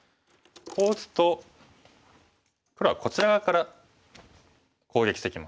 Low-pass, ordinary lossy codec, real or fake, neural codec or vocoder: none; none; real; none